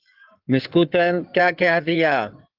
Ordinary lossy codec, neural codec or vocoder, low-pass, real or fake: Opus, 24 kbps; codec, 16 kHz in and 24 kHz out, 2.2 kbps, FireRedTTS-2 codec; 5.4 kHz; fake